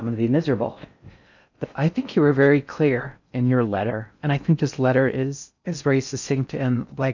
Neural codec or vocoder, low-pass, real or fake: codec, 16 kHz in and 24 kHz out, 0.6 kbps, FocalCodec, streaming, 4096 codes; 7.2 kHz; fake